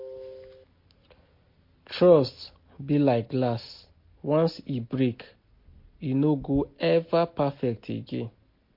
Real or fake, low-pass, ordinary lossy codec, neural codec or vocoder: real; 5.4 kHz; MP3, 32 kbps; none